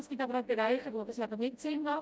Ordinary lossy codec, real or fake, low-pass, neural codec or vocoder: none; fake; none; codec, 16 kHz, 0.5 kbps, FreqCodec, smaller model